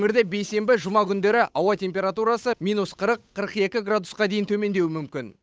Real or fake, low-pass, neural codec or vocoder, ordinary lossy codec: fake; none; codec, 16 kHz, 8 kbps, FunCodec, trained on Chinese and English, 25 frames a second; none